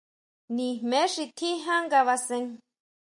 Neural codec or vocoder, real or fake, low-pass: none; real; 10.8 kHz